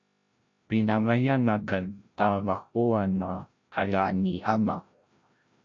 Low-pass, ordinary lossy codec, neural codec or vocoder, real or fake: 7.2 kHz; MP3, 48 kbps; codec, 16 kHz, 0.5 kbps, FreqCodec, larger model; fake